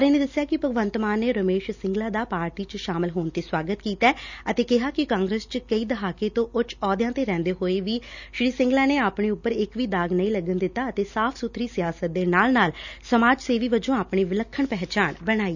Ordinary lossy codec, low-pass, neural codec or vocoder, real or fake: none; 7.2 kHz; none; real